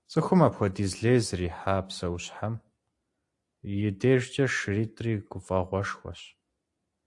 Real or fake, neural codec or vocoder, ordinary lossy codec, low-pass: real; none; AAC, 64 kbps; 10.8 kHz